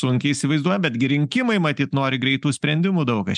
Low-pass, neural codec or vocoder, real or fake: 10.8 kHz; none; real